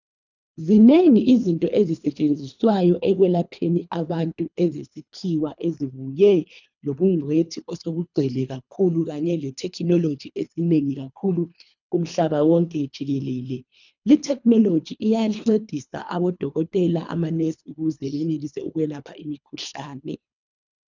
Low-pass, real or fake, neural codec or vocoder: 7.2 kHz; fake; codec, 24 kHz, 3 kbps, HILCodec